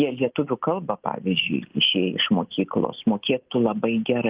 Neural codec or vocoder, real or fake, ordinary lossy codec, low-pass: none; real; Opus, 24 kbps; 3.6 kHz